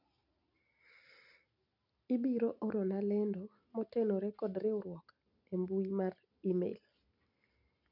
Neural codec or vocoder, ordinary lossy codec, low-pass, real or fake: none; none; 5.4 kHz; real